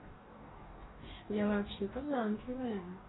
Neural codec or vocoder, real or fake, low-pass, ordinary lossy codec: codec, 44.1 kHz, 2.6 kbps, DAC; fake; 7.2 kHz; AAC, 16 kbps